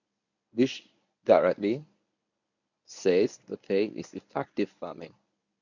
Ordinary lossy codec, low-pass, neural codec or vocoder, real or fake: none; 7.2 kHz; codec, 24 kHz, 0.9 kbps, WavTokenizer, medium speech release version 1; fake